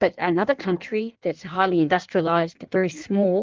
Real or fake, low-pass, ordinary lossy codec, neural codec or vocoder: fake; 7.2 kHz; Opus, 32 kbps; codec, 16 kHz in and 24 kHz out, 1.1 kbps, FireRedTTS-2 codec